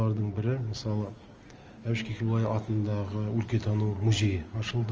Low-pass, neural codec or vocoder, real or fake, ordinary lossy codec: 7.2 kHz; none; real; Opus, 24 kbps